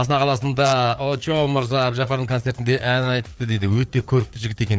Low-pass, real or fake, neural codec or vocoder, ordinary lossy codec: none; fake; codec, 16 kHz, 4 kbps, FreqCodec, larger model; none